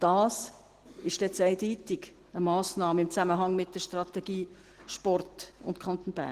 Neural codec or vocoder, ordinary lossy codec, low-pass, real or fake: none; Opus, 16 kbps; 14.4 kHz; real